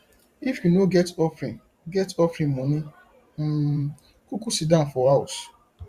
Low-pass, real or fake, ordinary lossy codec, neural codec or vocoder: 14.4 kHz; fake; Opus, 64 kbps; vocoder, 44.1 kHz, 128 mel bands every 512 samples, BigVGAN v2